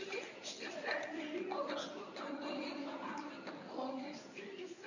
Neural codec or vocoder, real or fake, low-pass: codec, 24 kHz, 0.9 kbps, WavTokenizer, medium speech release version 1; fake; 7.2 kHz